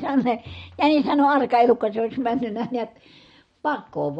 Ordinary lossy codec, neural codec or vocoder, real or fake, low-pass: MP3, 48 kbps; none; real; 10.8 kHz